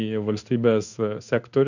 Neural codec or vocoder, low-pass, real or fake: codec, 16 kHz in and 24 kHz out, 1 kbps, XY-Tokenizer; 7.2 kHz; fake